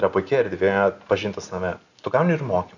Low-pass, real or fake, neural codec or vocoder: 7.2 kHz; real; none